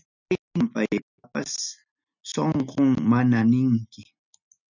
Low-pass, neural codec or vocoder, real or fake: 7.2 kHz; none; real